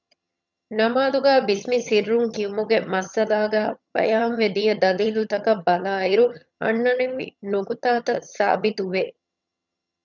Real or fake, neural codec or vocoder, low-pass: fake; vocoder, 22.05 kHz, 80 mel bands, HiFi-GAN; 7.2 kHz